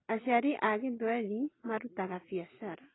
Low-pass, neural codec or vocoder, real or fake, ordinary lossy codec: 7.2 kHz; none; real; AAC, 16 kbps